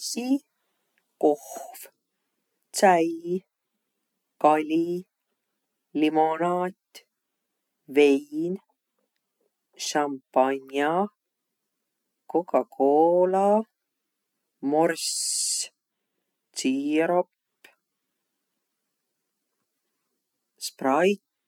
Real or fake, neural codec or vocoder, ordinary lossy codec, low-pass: real; none; none; 19.8 kHz